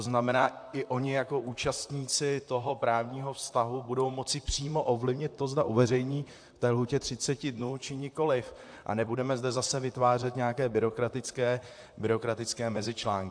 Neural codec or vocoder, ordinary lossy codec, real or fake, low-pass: vocoder, 44.1 kHz, 128 mel bands, Pupu-Vocoder; AAC, 64 kbps; fake; 9.9 kHz